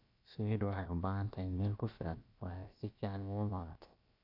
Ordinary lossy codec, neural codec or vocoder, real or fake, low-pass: AAC, 32 kbps; codec, 16 kHz, about 1 kbps, DyCAST, with the encoder's durations; fake; 5.4 kHz